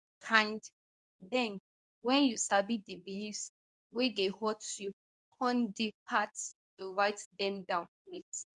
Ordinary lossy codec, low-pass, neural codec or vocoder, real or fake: none; 10.8 kHz; codec, 24 kHz, 0.9 kbps, WavTokenizer, medium speech release version 2; fake